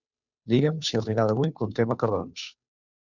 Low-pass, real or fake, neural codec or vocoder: 7.2 kHz; fake; codec, 16 kHz, 2 kbps, FunCodec, trained on Chinese and English, 25 frames a second